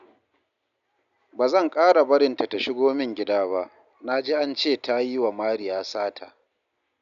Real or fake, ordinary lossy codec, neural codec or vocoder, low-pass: real; none; none; 7.2 kHz